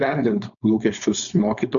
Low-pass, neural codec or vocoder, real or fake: 7.2 kHz; codec, 16 kHz, 4.8 kbps, FACodec; fake